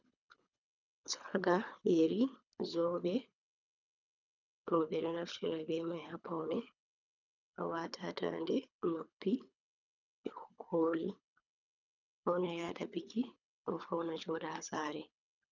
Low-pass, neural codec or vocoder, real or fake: 7.2 kHz; codec, 24 kHz, 3 kbps, HILCodec; fake